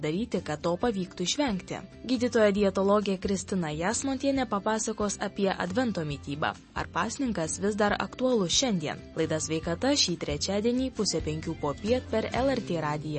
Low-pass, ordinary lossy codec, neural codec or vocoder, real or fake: 10.8 kHz; MP3, 32 kbps; none; real